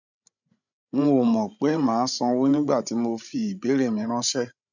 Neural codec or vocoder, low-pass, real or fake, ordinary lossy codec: codec, 16 kHz, 16 kbps, FreqCodec, larger model; 7.2 kHz; fake; none